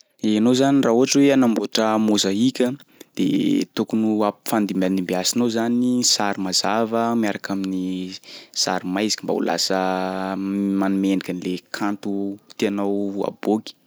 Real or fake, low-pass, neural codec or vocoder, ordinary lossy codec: real; none; none; none